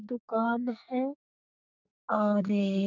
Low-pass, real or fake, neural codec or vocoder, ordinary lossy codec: 7.2 kHz; fake; codec, 44.1 kHz, 2.6 kbps, SNAC; none